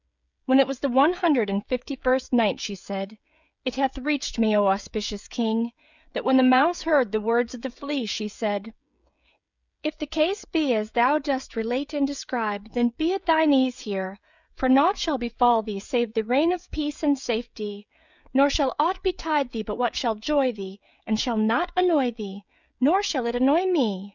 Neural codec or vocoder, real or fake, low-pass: codec, 16 kHz, 16 kbps, FreqCodec, smaller model; fake; 7.2 kHz